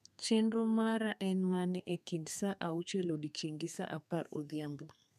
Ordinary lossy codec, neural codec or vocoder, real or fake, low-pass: none; codec, 32 kHz, 1.9 kbps, SNAC; fake; 14.4 kHz